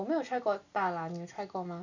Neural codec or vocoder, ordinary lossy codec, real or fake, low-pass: none; none; real; 7.2 kHz